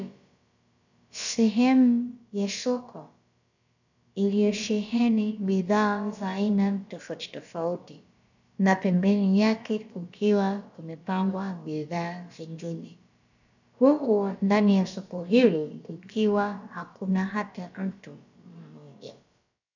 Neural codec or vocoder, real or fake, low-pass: codec, 16 kHz, about 1 kbps, DyCAST, with the encoder's durations; fake; 7.2 kHz